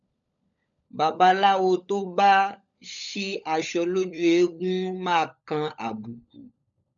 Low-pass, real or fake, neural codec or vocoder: 7.2 kHz; fake; codec, 16 kHz, 16 kbps, FunCodec, trained on LibriTTS, 50 frames a second